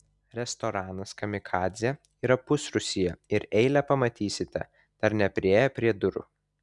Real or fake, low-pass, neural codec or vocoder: real; 10.8 kHz; none